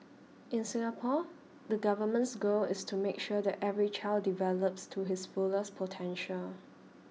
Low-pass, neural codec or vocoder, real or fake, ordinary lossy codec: none; none; real; none